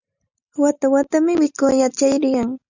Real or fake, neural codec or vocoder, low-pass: real; none; 7.2 kHz